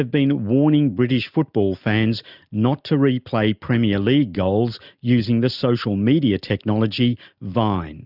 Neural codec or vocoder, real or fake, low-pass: none; real; 5.4 kHz